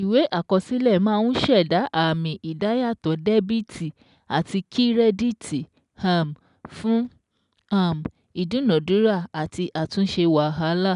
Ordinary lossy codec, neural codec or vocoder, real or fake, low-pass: none; none; real; 10.8 kHz